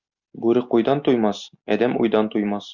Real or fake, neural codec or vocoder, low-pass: real; none; 7.2 kHz